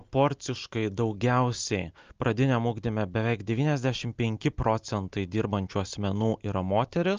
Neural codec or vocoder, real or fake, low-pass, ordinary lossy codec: none; real; 7.2 kHz; Opus, 24 kbps